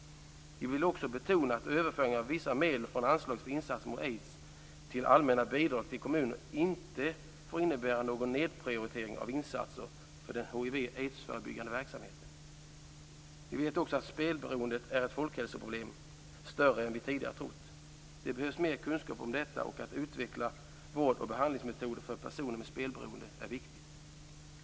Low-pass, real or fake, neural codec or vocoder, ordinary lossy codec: none; real; none; none